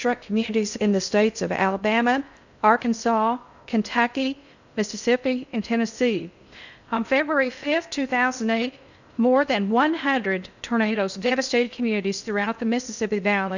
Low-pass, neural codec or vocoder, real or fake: 7.2 kHz; codec, 16 kHz in and 24 kHz out, 0.6 kbps, FocalCodec, streaming, 2048 codes; fake